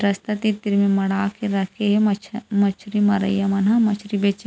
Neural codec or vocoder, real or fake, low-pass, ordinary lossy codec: none; real; none; none